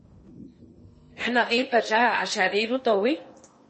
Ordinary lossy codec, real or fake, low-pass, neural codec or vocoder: MP3, 32 kbps; fake; 10.8 kHz; codec, 16 kHz in and 24 kHz out, 0.8 kbps, FocalCodec, streaming, 65536 codes